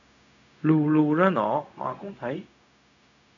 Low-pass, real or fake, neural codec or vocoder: 7.2 kHz; fake; codec, 16 kHz, 0.4 kbps, LongCat-Audio-Codec